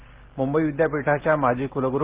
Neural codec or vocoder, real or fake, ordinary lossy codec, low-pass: none; real; Opus, 16 kbps; 3.6 kHz